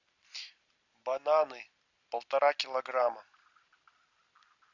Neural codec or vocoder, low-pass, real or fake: none; 7.2 kHz; real